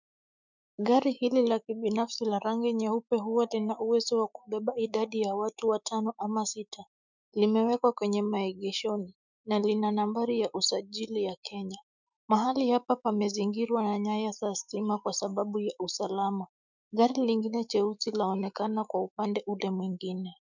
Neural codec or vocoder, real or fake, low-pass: autoencoder, 48 kHz, 128 numbers a frame, DAC-VAE, trained on Japanese speech; fake; 7.2 kHz